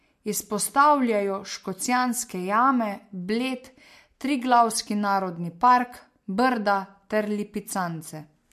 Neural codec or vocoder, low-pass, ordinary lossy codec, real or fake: none; 14.4 kHz; MP3, 64 kbps; real